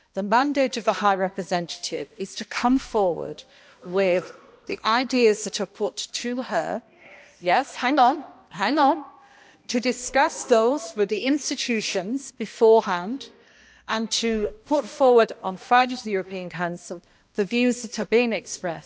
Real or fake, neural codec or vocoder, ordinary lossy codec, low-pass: fake; codec, 16 kHz, 1 kbps, X-Codec, HuBERT features, trained on balanced general audio; none; none